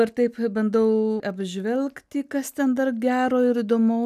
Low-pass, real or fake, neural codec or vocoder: 14.4 kHz; real; none